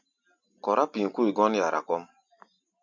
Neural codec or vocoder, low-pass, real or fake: none; 7.2 kHz; real